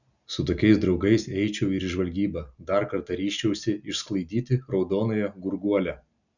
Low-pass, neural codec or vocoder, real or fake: 7.2 kHz; none; real